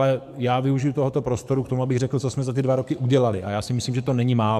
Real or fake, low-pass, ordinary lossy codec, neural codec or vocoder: fake; 14.4 kHz; MP3, 96 kbps; codec, 44.1 kHz, 7.8 kbps, DAC